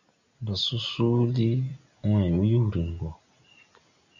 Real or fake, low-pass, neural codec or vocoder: real; 7.2 kHz; none